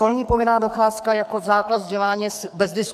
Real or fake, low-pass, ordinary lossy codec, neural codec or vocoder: fake; 14.4 kHz; AAC, 96 kbps; codec, 44.1 kHz, 2.6 kbps, SNAC